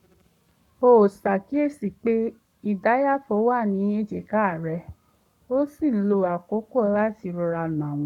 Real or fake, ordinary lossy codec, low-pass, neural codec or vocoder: fake; none; 19.8 kHz; codec, 44.1 kHz, 7.8 kbps, Pupu-Codec